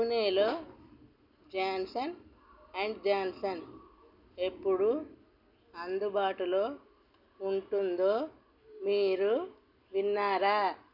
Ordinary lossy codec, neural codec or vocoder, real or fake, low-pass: none; none; real; 5.4 kHz